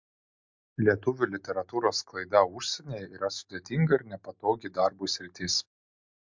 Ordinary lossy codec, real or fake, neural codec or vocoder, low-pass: MP3, 64 kbps; real; none; 7.2 kHz